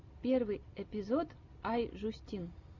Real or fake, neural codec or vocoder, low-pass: real; none; 7.2 kHz